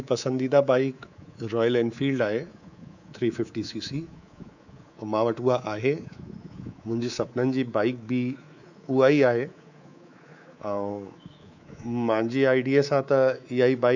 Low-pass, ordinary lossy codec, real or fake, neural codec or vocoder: 7.2 kHz; none; fake; codec, 24 kHz, 3.1 kbps, DualCodec